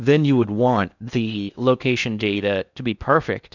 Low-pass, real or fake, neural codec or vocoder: 7.2 kHz; fake; codec, 16 kHz in and 24 kHz out, 0.6 kbps, FocalCodec, streaming, 2048 codes